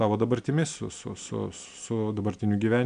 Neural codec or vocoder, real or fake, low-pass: none; real; 9.9 kHz